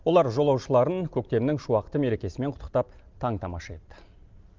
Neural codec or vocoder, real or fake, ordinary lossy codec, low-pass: none; real; Opus, 32 kbps; 7.2 kHz